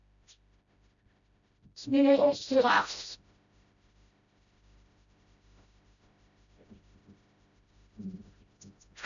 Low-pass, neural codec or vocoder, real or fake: 7.2 kHz; codec, 16 kHz, 0.5 kbps, FreqCodec, smaller model; fake